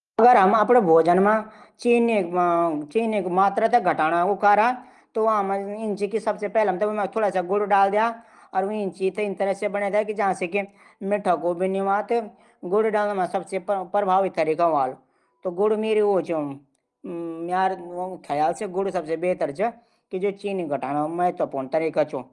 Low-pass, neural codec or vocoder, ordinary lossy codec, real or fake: 10.8 kHz; none; Opus, 24 kbps; real